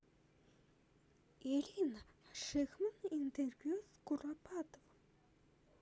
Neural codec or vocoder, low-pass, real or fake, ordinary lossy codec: none; none; real; none